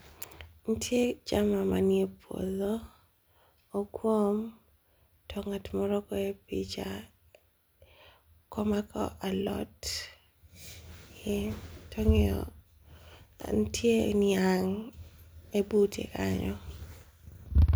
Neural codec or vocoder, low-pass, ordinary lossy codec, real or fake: none; none; none; real